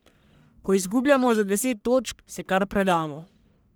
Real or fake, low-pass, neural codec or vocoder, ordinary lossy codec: fake; none; codec, 44.1 kHz, 1.7 kbps, Pupu-Codec; none